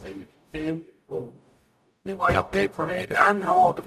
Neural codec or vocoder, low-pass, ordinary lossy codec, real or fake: codec, 44.1 kHz, 0.9 kbps, DAC; 14.4 kHz; none; fake